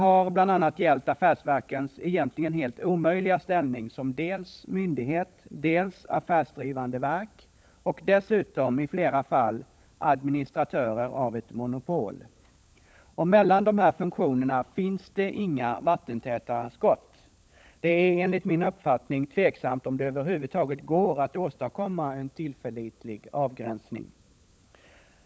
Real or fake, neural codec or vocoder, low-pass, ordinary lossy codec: fake; codec, 16 kHz, 16 kbps, FunCodec, trained on LibriTTS, 50 frames a second; none; none